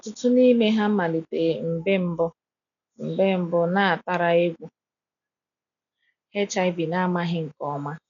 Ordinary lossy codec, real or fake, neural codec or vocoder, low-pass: none; real; none; 7.2 kHz